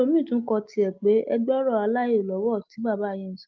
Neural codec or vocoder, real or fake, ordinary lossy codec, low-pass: none; real; Opus, 24 kbps; 7.2 kHz